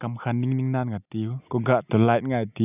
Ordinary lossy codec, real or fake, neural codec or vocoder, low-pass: none; real; none; 3.6 kHz